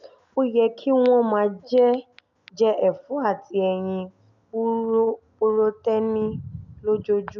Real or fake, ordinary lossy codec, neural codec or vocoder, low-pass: real; none; none; 7.2 kHz